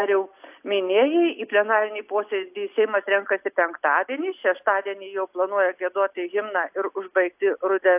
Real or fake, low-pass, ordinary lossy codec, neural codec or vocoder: real; 3.6 kHz; AAC, 32 kbps; none